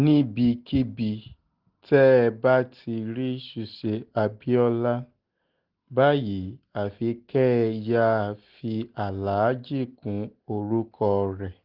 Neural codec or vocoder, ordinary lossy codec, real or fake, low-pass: none; Opus, 16 kbps; real; 5.4 kHz